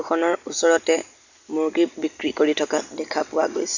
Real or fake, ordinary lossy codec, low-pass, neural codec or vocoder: real; none; 7.2 kHz; none